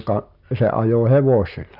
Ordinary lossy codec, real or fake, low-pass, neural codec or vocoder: none; real; 5.4 kHz; none